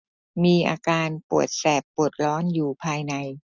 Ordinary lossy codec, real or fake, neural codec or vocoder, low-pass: none; real; none; none